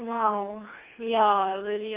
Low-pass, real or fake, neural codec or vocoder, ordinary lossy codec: 3.6 kHz; fake; codec, 24 kHz, 3 kbps, HILCodec; Opus, 32 kbps